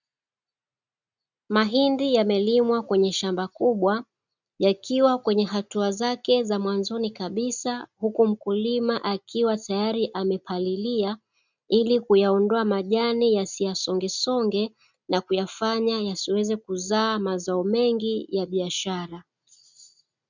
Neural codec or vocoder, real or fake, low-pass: none; real; 7.2 kHz